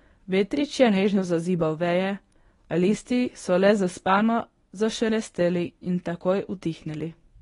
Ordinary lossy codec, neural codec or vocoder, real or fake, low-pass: AAC, 32 kbps; codec, 24 kHz, 0.9 kbps, WavTokenizer, medium speech release version 1; fake; 10.8 kHz